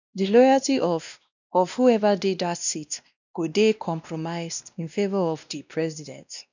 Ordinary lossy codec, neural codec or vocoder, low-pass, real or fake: none; codec, 16 kHz, 1 kbps, X-Codec, WavLM features, trained on Multilingual LibriSpeech; 7.2 kHz; fake